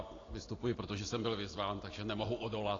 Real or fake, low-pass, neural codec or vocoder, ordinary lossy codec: real; 7.2 kHz; none; AAC, 32 kbps